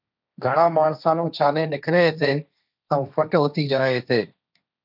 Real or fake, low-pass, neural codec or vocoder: fake; 5.4 kHz; codec, 16 kHz, 1.1 kbps, Voila-Tokenizer